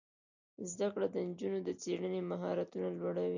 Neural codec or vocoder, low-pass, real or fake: none; 7.2 kHz; real